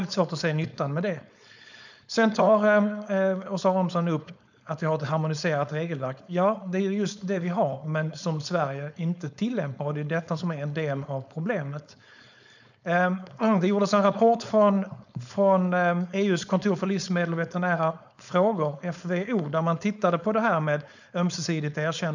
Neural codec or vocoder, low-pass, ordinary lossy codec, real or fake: codec, 16 kHz, 4.8 kbps, FACodec; 7.2 kHz; none; fake